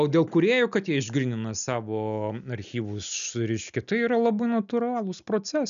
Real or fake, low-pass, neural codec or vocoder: real; 7.2 kHz; none